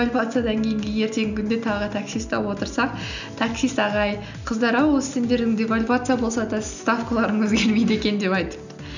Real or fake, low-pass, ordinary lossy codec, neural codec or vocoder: real; 7.2 kHz; none; none